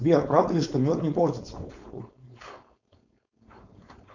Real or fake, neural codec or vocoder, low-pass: fake; codec, 16 kHz, 4.8 kbps, FACodec; 7.2 kHz